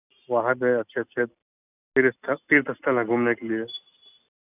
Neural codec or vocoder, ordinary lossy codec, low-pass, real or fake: none; none; 3.6 kHz; real